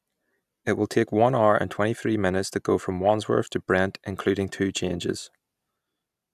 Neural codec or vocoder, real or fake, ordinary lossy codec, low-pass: none; real; none; 14.4 kHz